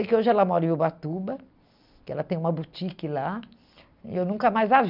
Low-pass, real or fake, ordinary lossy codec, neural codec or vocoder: 5.4 kHz; real; none; none